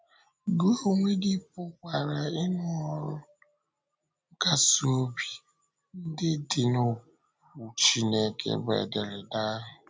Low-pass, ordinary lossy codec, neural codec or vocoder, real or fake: none; none; none; real